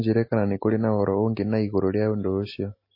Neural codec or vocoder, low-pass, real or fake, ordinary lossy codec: none; 5.4 kHz; real; MP3, 24 kbps